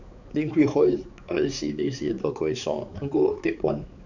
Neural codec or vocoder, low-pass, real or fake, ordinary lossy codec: codec, 16 kHz, 4 kbps, X-Codec, HuBERT features, trained on balanced general audio; 7.2 kHz; fake; none